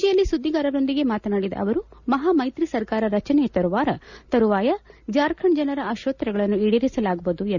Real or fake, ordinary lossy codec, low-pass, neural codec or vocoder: real; none; 7.2 kHz; none